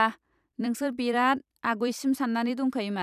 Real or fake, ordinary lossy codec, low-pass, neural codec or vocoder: fake; none; 14.4 kHz; vocoder, 44.1 kHz, 128 mel bands every 256 samples, BigVGAN v2